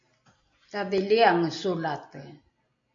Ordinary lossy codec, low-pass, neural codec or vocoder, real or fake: MP3, 48 kbps; 7.2 kHz; none; real